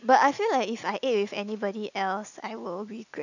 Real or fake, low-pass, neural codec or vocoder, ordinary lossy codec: real; 7.2 kHz; none; none